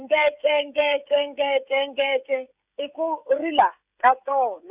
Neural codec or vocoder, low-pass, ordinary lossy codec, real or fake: codec, 16 kHz, 16 kbps, FreqCodec, smaller model; 3.6 kHz; Opus, 64 kbps; fake